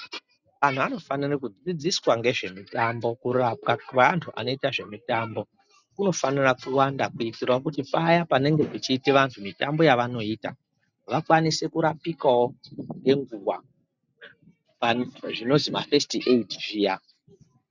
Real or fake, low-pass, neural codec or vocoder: real; 7.2 kHz; none